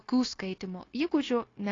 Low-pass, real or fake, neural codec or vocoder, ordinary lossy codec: 7.2 kHz; fake; codec, 16 kHz, 0.9 kbps, LongCat-Audio-Codec; AAC, 32 kbps